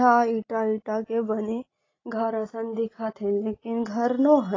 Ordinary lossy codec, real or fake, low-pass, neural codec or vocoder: AAC, 32 kbps; real; 7.2 kHz; none